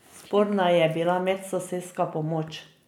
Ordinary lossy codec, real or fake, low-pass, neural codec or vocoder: none; real; 19.8 kHz; none